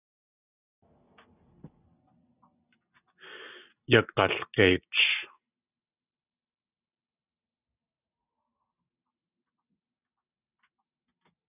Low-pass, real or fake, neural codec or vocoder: 3.6 kHz; real; none